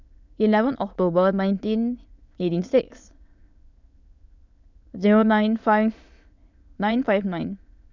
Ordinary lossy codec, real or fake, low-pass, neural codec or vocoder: none; fake; 7.2 kHz; autoencoder, 22.05 kHz, a latent of 192 numbers a frame, VITS, trained on many speakers